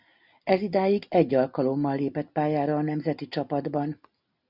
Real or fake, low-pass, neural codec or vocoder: real; 5.4 kHz; none